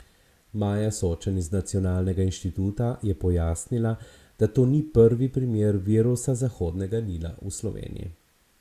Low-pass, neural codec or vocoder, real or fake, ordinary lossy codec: 14.4 kHz; none; real; Opus, 64 kbps